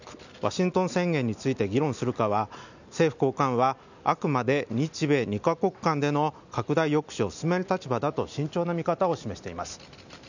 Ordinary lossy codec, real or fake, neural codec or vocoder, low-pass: none; real; none; 7.2 kHz